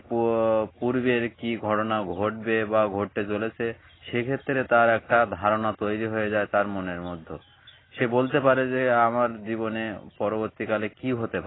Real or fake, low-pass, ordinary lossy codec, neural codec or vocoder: real; 7.2 kHz; AAC, 16 kbps; none